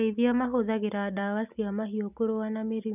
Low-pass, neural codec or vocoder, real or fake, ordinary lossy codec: 3.6 kHz; none; real; none